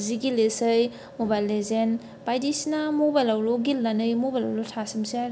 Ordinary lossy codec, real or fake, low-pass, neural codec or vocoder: none; real; none; none